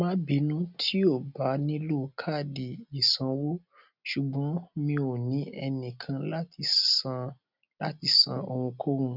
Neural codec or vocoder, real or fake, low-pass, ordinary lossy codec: none; real; 5.4 kHz; none